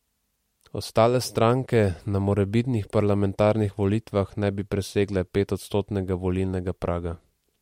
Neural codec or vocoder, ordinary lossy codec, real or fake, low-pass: none; MP3, 64 kbps; real; 19.8 kHz